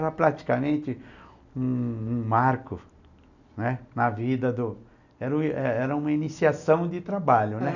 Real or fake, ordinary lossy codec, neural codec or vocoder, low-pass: real; none; none; 7.2 kHz